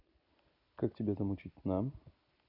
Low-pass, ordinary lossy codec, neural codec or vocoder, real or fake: 5.4 kHz; none; none; real